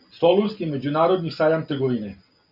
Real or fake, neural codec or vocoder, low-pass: real; none; 5.4 kHz